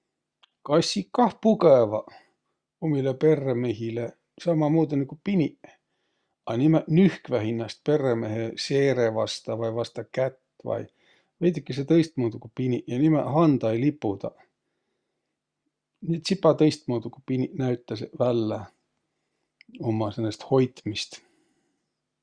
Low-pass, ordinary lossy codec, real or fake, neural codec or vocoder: 9.9 kHz; Opus, 64 kbps; real; none